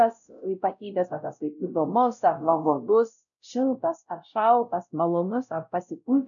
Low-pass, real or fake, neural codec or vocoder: 7.2 kHz; fake; codec, 16 kHz, 0.5 kbps, X-Codec, WavLM features, trained on Multilingual LibriSpeech